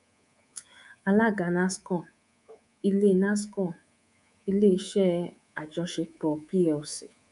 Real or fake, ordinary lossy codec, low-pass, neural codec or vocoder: fake; none; 10.8 kHz; codec, 24 kHz, 3.1 kbps, DualCodec